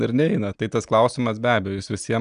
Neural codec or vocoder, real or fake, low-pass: none; real; 9.9 kHz